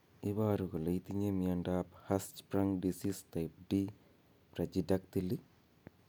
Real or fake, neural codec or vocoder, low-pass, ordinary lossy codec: real; none; none; none